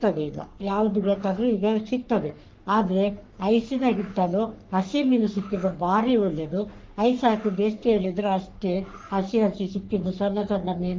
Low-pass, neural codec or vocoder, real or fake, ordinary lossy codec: 7.2 kHz; codec, 44.1 kHz, 3.4 kbps, Pupu-Codec; fake; Opus, 24 kbps